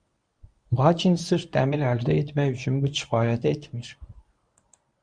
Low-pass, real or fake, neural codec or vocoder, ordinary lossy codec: 9.9 kHz; fake; codec, 24 kHz, 0.9 kbps, WavTokenizer, medium speech release version 1; Opus, 64 kbps